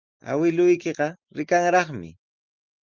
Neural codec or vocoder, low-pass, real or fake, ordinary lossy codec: none; 7.2 kHz; real; Opus, 24 kbps